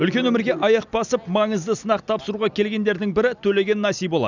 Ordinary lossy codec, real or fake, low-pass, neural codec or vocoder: none; real; 7.2 kHz; none